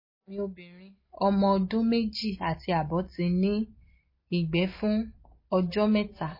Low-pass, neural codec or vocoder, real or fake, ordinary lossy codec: 5.4 kHz; none; real; MP3, 24 kbps